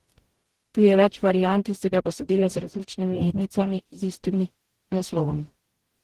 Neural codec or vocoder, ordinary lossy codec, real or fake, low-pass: codec, 44.1 kHz, 0.9 kbps, DAC; Opus, 16 kbps; fake; 14.4 kHz